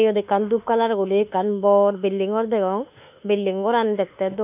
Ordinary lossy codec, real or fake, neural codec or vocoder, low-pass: none; fake; autoencoder, 48 kHz, 32 numbers a frame, DAC-VAE, trained on Japanese speech; 3.6 kHz